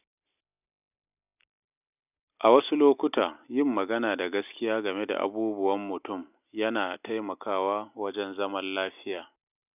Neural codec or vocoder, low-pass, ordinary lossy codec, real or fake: none; 3.6 kHz; none; real